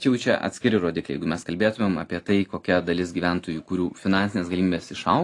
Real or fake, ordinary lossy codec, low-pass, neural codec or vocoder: real; AAC, 48 kbps; 10.8 kHz; none